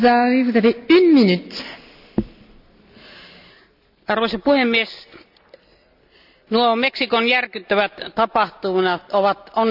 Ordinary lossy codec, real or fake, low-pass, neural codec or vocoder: none; real; 5.4 kHz; none